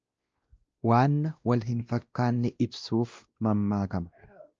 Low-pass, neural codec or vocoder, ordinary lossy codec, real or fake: 7.2 kHz; codec, 16 kHz, 1 kbps, X-Codec, WavLM features, trained on Multilingual LibriSpeech; Opus, 24 kbps; fake